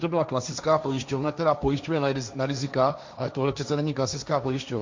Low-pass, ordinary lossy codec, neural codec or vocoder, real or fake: 7.2 kHz; MP3, 64 kbps; codec, 16 kHz, 1.1 kbps, Voila-Tokenizer; fake